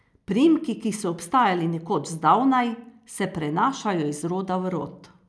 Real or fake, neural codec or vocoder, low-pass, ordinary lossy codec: real; none; none; none